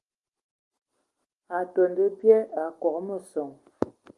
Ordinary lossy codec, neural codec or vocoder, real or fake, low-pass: Opus, 24 kbps; none; real; 10.8 kHz